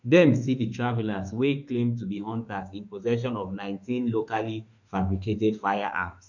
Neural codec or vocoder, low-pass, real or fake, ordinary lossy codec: autoencoder, 48 kHz, 32 numbers a frame, DAC-VAE, trained on Japanese speech; 7.2 kHz; fake; none